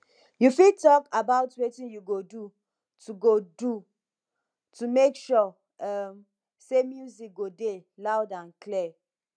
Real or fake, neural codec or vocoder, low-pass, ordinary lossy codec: real; none; 9.9 kHz; none